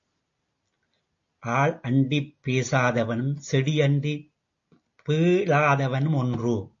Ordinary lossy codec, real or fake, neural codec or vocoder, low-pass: AAC, 48 kbps; real; none; 7.2 kHz